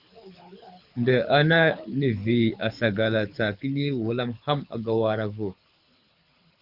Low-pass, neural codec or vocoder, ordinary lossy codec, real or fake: 5.4 kHz; codec, 24 kHz, 6 kbps, HILCodec; Opus, 64 kbps; fake